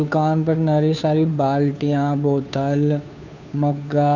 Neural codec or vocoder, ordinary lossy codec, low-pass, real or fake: codec, 16 kHz, 2 kbps, FunCodec, trained on Chinese and English, 25 frames a second; none; 7.2 kHz; fake